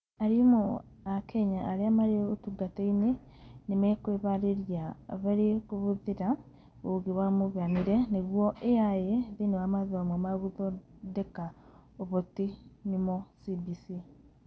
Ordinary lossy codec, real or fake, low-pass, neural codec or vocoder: none; real; none; none